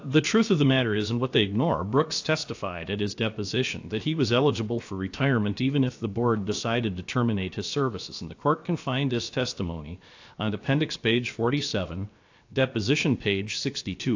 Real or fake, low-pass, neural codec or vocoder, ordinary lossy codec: fake; 7.2 kHz; codec, 16 kHz, about 1 kbps, DyCAST, with the encoder's durations; AAC, 48 kbps